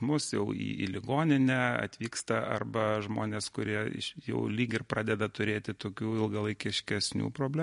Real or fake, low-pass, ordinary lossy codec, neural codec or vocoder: real; 10.8 kHz; MP3, 48 kbps; none